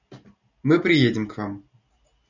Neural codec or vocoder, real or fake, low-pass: none; real; 7.2 kHz